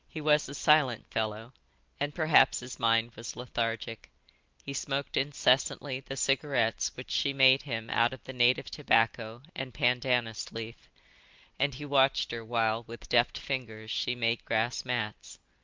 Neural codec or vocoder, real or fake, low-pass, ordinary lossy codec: none; real; 7.2 kHz; Opus, 24 kbps